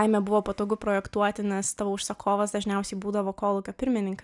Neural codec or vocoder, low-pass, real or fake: none; 10.8 kHz; real